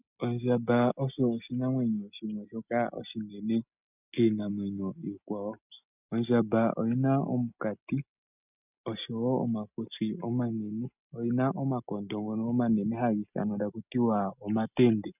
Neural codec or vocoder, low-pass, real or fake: none; 3.6 kHz; real